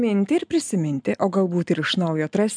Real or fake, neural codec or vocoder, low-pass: real; none; 9.9 kHz